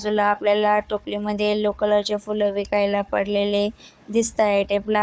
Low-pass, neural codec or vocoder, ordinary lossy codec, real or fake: none; codec, 16 kHz, 4 kbps, FunCodec, trained on Chinese and English, 50 frames a second; none; fake